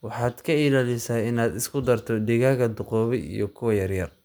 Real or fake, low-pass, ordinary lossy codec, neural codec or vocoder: real; none; none; none